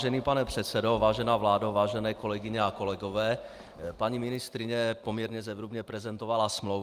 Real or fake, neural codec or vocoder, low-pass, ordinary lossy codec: real; none; 14.4 kHz; Opus, 32 kbps